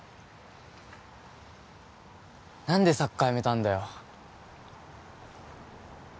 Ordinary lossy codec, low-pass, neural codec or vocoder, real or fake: none; none; none; real